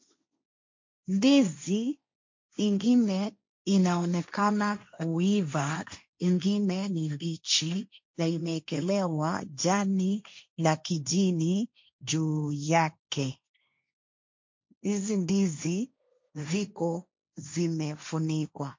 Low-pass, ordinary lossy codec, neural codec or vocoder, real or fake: 7.2 kHz; MP3, 48 kbps; codec, 16 kHz, 1.1 kbps, Voila-Tokenizer; fake